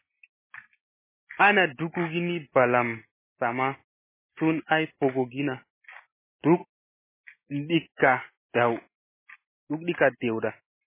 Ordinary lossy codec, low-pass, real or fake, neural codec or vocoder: MP3, 16 kbps; 3.6 kHz; real; none